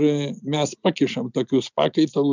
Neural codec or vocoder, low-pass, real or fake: none; 7.2 kHz; real